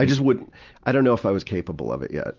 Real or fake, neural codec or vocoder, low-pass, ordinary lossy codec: real; none; 7.2 kHz; Opus, 32 kbps